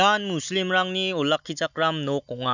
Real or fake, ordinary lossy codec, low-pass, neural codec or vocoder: real; none; 7.2 kHz; none